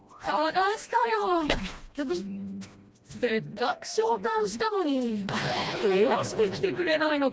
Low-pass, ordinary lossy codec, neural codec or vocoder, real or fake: none; none; codec, 16 kHz, 1 kbps, FreqCodec, smaller model; fake